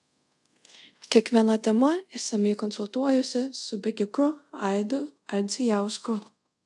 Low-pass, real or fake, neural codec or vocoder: 10.8 kHz; fake; codec, 24 kHz, 0.5 kbps, DualCodec